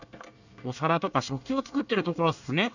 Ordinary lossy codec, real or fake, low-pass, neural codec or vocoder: none; fake; 7.2 kHz; codec, 24 kHz, 1 kbps, SNAC